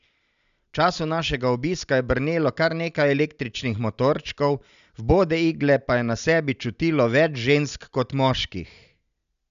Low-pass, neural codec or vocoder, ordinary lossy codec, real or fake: 7.2 kHz; none; none; real